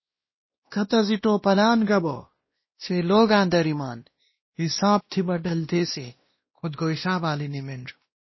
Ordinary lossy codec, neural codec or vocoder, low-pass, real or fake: MP3, 24 kbps; codec, 16 kHz, 1 kbps, X-Codec, WavLM features, trained on Multilingual LibriSpeech; 7.2 kHz; fake